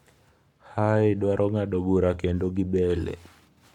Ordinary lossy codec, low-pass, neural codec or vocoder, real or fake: MP3, 96 kbps; 19.8 kHz; codec, 44.1 kHz, 7.8 kbps, Pupu-Codec; fake